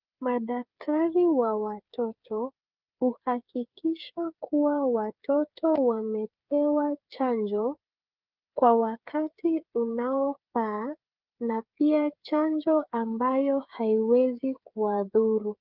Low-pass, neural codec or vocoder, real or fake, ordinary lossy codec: 5.4 kHz; codec, 16 kHz, 8 kbps, FreqCodec, smaller model; fake; Opus, 32 kbps